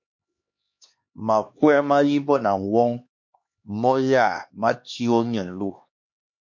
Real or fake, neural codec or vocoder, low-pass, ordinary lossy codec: fake; codec, 16 kHz, 2 kbps, X-Codec, HuBERT features, trained on LibriSpeech; 7.2 kHz; MP3, 48 kbps